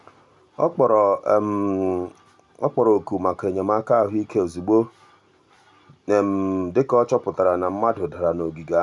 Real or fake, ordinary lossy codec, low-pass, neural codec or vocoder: real; none; 10.8 kHz; none